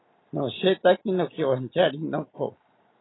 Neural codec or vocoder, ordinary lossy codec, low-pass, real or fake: none; AAC, 16 kbps; 7.2 kHz; real